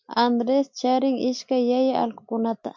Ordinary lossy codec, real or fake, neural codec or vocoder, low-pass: MP3, 64 kbps; real; none; 7.2 kHz